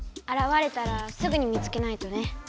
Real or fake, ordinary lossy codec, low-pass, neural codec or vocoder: real; none; none; none